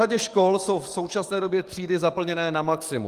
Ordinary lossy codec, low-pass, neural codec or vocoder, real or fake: Opus, 32 kbps; 14.4 kHz; codec, 44.1 kHz, 7.8 kbps, DAC; fake